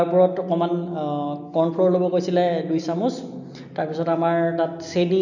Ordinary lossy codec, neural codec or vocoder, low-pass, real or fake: none; none; 7.2 kHz; real